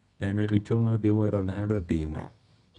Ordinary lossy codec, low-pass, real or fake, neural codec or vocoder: none; 10.8 kHz; fake; codec, 24 kHz, 0.9 kbps, WavTokenizer, medium music audio release